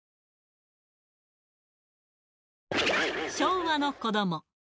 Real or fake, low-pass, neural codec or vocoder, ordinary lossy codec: real; none; none; none